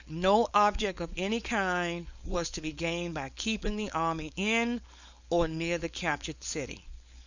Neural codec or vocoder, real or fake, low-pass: codec, 16 kHz, 4.8 kbps, FACodec; fake; 7.2 kHz